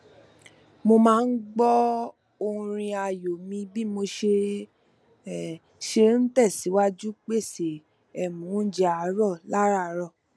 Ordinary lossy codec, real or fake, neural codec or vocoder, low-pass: none; real; none; none